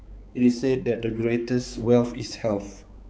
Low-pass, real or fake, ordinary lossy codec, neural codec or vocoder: none; fake; none; codec, 16 kHz, 4 kbps, X-Codec, HuBERT features, trained on balanced general audio